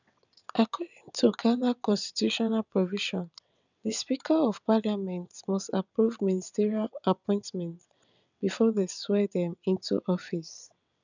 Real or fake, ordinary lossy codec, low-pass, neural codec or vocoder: fake; none; 7.2 kHz; vocoder, 22.05 kHz, 80 mel bands, WaveNeXt